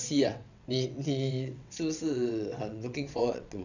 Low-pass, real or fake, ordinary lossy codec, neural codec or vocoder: 7.2 kHz; fake; none; vocoder, 22.05 kHz, 80 mel bands, WaveNeXt